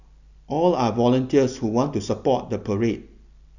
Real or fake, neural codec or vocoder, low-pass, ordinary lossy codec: real; none; 7.2 kHz; none